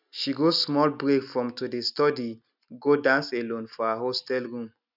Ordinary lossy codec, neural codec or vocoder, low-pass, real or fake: none; none; 5.4 kHz; real